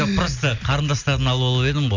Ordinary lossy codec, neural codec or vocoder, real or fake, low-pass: none; none; real; 7.2 kHz